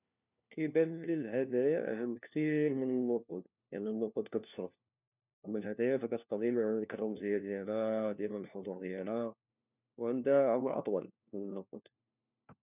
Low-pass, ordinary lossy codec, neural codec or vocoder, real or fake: 3.6 kHz; none; codec, 16 kHz, 1 kbps, FunCodec, trained on LibriTTS, 50 frames a second; fake